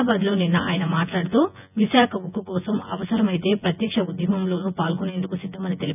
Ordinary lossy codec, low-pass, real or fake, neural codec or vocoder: none; 3.6 kHz; fake; vocoder, 24 kHz, 100 mel bands, Vocos